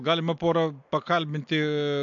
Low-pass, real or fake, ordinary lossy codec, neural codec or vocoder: 7.2 kHz; real; AAC, 64 kbps; none